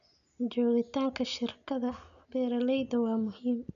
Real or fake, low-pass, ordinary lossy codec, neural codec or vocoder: real; 7.2 kHz; none; none